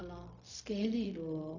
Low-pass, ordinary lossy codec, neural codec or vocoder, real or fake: 7.2 kHz; AAC, 48 kbps; codec, 16 kHz, 0.4 kbps, LongCat-Audio-Codec; fake